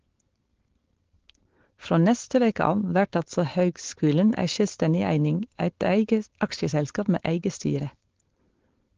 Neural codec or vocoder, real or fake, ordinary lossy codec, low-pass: codec, 16 kHz, 4.8 kbps, FACodec; fake; Opus, 16 kbps; 7.2 kHz